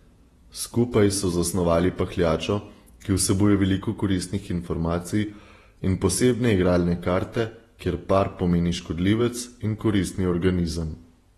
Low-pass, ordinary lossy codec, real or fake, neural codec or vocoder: 19.8 kHz; AAC, 32 kbps; fake; vocoder, 48 kHz, 128 mel bands, Vocos